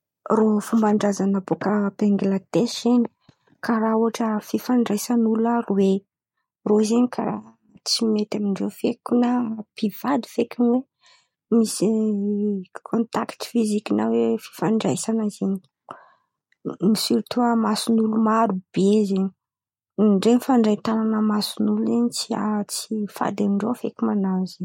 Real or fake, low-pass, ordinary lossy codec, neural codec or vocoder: real; 19.8 kHz; MP3, 64 kbps; none